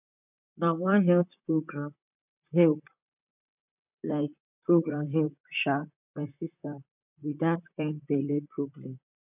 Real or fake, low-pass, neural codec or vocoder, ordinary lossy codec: fake; 3.6 kHz; vocoder, 44.1 kHz, 128 mel bands, Pupu-Vocoder; none